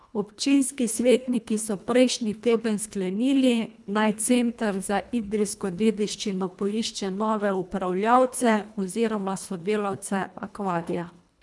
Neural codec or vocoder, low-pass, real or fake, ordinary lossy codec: codec, 24 kHz, 1.5 kbps, HILCodec; none; fake; none